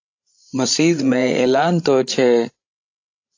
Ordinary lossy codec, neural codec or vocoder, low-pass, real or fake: AAC, 48 kbps; codec, 16 kHz, 16 kbps, FreqCodec, larger model; 7.2 kHz; fake